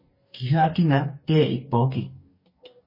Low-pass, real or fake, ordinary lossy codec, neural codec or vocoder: 5.4 kHz; fake; MP3, 24 kbps; codec, 44.1 kHz, 2.6 kbps, DAC